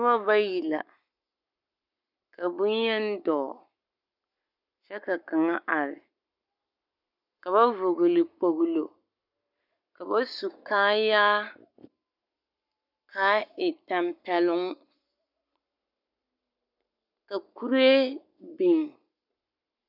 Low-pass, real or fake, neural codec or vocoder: 5.4 kHz; fake; codec, 44.1 kHz, 7.8 kbps, Pupu-Codec